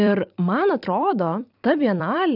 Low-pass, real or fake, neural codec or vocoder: 5.4 kHz; fake; vocoder, 44.1 kHz, 128 mel bands every 256 samples, BigVGAN v2